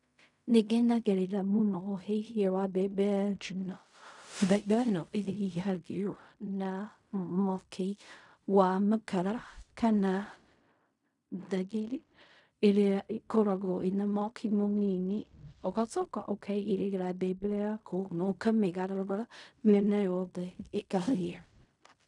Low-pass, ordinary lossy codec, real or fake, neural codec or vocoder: 10.8 kHz; none; fake; codec, 16 kHz in and 24 kHz out, 0.4 kbps, LongCat-Audio-Codec, fine tuned four codebook decoder